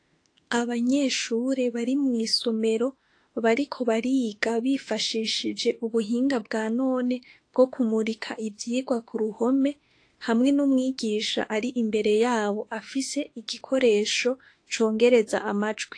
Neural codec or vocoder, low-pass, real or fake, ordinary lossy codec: autoencoder, 48 kHz, 32 numbers a frame, DAC-VAE, trained on Japanese speech; 9.9 kHz; fake; AAC, 48 kbps